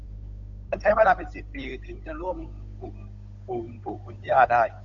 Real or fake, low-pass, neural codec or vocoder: fake; 7.2 kHz; codec, 16 kHz, 8 kbps, FunCodec, trained on Chinese and English, 25 frames a second